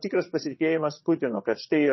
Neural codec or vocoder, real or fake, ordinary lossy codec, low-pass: vocoder, 22.05 kHz, 80 mel bands, Vocos; fake; MP3, 24 kbps; 7.2 kHz